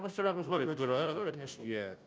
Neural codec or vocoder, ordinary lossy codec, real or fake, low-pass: codec, 16 kHz, 0.5 kbps, FunCodec, trained on Chinese and English, 25 frames a second; none; fake; none